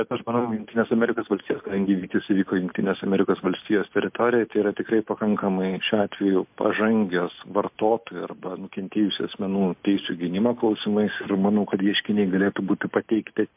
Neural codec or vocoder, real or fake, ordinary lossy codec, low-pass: none; real; MP3, 32 kbps; 3.6 kHz